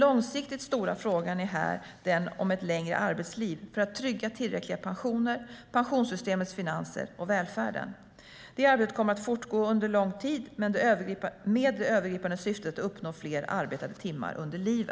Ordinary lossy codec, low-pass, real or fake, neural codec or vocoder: none; none; real; none